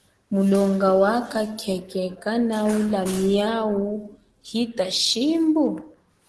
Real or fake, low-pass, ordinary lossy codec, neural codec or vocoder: fake; 10.8 kHz; Opus, 16 kbps; autoencoder, 48 kHz, 128 numbers a frame, DAC-VAE, trained on Japanese speech